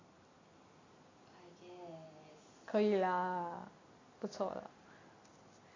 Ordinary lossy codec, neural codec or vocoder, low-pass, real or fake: AAC, 32 kbps; none; 7.2 kHz; real